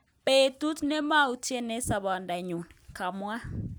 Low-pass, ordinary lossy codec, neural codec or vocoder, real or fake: none; none; none; real